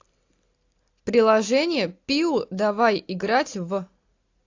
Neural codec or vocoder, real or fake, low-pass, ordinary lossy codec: none; real; 7.2 kHz; AAC, 48 kbps